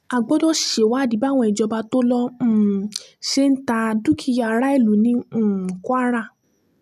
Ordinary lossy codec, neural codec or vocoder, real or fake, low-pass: none; none; real; 14.4 kHz